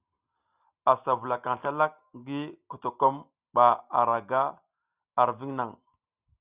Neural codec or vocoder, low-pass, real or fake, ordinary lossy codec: none; 3.6 kHz; real; Opus, 64 kbps